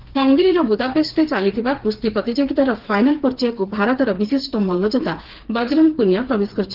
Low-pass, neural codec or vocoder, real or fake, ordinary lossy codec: 5.4 kHz; codec, 16 kHz, 4 kbps, FreqCodec, smaller model; fake; Opus, 16 kbps